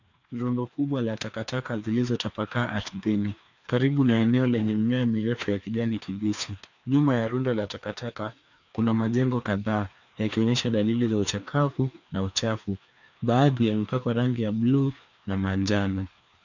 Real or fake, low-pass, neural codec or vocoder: fake; 7.2 kHz; codec, 16 kHz, 2 kbps, FreqCodec, larger model